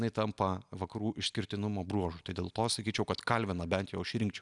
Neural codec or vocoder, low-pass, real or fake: none; 10.8 kHz; real